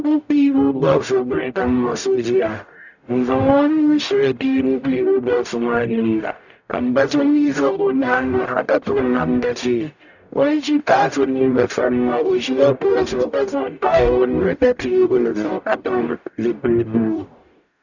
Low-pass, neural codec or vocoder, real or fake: 7.2 kHz; codec, 44.1 kHz, 0.9 kbps, DAC; fake